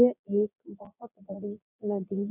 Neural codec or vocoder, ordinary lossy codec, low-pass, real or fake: vocoder, 22.05 kHz, 80 mel bands, Vocos; none; 3.6 kHz; fake